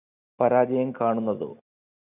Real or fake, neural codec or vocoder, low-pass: real; none; 3.6 kHz